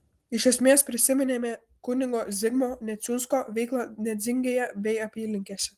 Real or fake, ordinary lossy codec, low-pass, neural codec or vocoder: real; Opus, 24 kbps; 14.4 kHz; none